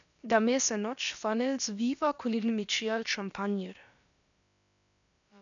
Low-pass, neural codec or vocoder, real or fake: 7.2 kHz; codec, 16 kHz, about 1 kbps, DyCAST, with the encoder's durations; fake